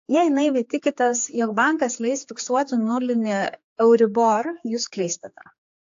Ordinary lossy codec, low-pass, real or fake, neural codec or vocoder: AAC, 48 kbps; 7.2 kHz; fake; codec, 16 kHz, 4 kbps, X-Codec, HuBERT features, trained on general audio